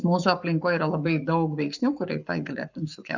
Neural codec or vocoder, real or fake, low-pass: codec, 44.1 kHz, 7.8 kbps, Pupu-Codec; fake; 7.2 kHz